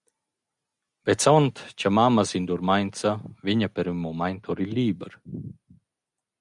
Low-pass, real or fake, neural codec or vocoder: 10.8 kHz; real; none